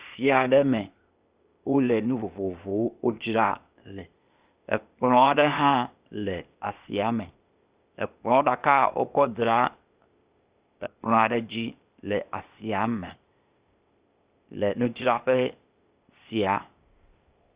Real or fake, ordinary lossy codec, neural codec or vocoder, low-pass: fake; Opus, 64 kbps; codec, 16 kHz, 0.8 kbps, ZipCodec; 3.6 kHz